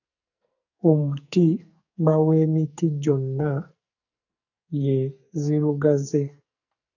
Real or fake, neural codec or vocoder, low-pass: fake; codec, 44.1 kHz, 2.6 kbps, SNAC; 7.2 kHz